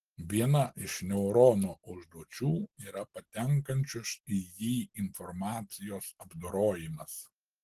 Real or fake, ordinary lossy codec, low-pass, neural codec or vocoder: real; Opus, 16 kbps; 14.4 kHz; none